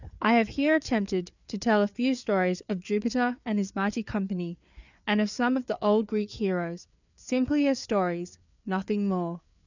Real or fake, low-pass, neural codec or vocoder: fake; 7.2 kHz; codec, 16 kHz, 4 kbps, FreqCodec, larger model